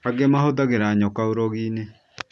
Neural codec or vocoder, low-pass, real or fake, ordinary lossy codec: none; none; real; none